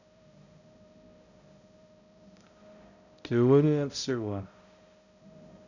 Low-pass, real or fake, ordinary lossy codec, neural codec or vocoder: 7.2 kHz; fake; none; codec, 16 kHz, 0.5 kbps, X-Codec, HuBERT features, trained on balanced general audio